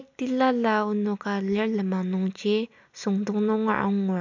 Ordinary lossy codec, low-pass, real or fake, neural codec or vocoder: MP3, 64 kbps; 7.2 kHz; real; none